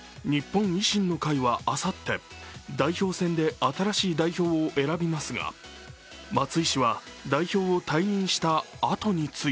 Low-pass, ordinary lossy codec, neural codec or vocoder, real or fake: none; none; none; real